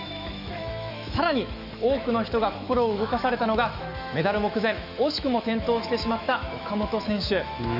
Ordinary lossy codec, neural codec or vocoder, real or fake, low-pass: none; autoencoder, 48 kHz, 128 numbers a frame, DAC-VAE, trained on Japanese speech; fake; 5.4 kHz